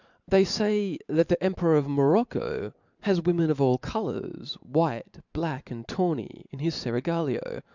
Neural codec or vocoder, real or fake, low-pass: vocoder, 44.1 kHz, 128 mel bands every 512 samples, BigVGAN v2; fake; 7.2 kHz